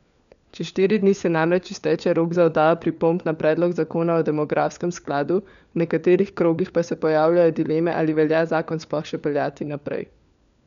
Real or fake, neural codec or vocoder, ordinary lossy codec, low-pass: fake; codec, 16 kHz, 4 kbps, FunCodec, trained on LibriTTS, 50 frames a second; MP3, 96 kbps; 7.2 kHz